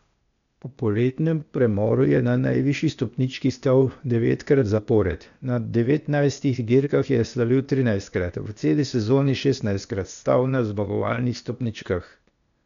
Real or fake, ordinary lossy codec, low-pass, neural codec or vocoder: fake; none; 7.2 kHz; codec, 16 kHz, 0.8 kbps, ZipCodec